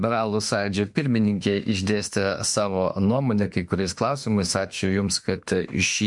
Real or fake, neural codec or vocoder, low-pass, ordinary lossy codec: fake; autoencoder, 48 kHz, 32 numbers a frame, DAC-VAE, trained on Japanese speech; 10.8 kHz; MP3, 64 kbps